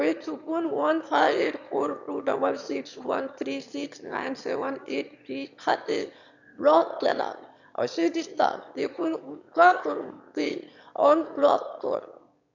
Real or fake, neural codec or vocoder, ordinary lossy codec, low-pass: fake; autoencoder, 22.05 kHz, a latent of 192 numbers a frame, VITS, trained on one speaker; none; 7.2 kHz